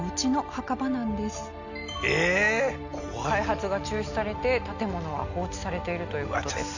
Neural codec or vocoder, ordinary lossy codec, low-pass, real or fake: none; none; 7.2 kHz; real